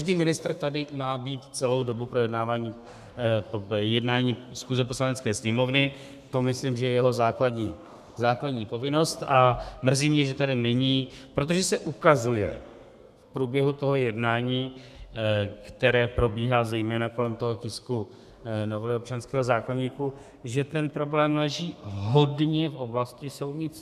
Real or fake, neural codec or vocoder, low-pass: fake; codec, 32 kHz, 1.9 kbps, SNAC; 14.4 kHz